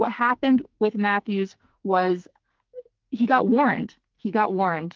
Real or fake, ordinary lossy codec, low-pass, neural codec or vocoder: fake; Opus, 24 kbps; 7.2 kHz; codec, 44.1 kHz, 2.6 kbps, SNAC